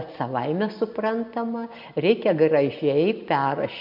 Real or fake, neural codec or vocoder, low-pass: real; none; 5.4 kHz